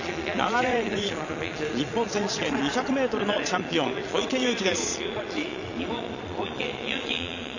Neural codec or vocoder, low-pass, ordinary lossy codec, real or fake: vocoder, 22.05 kHz, 80 mel bands, Vocos; 7.2 kHz; none; fake